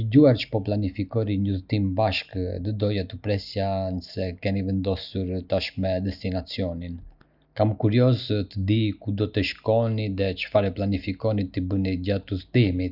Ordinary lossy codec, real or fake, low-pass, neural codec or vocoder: none; real; 5.4 kHz; none